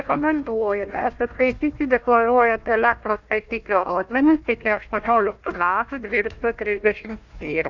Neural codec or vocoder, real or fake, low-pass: codec, 16 kHz, 1 kbps, FunCodec, trained on Chinese and English, 50 frames a second; fake; 7.2 kHz